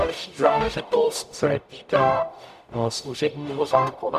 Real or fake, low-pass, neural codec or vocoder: fake; 14.4 kHz; codec, 44.1 kHz, 0.9 kbps, DAC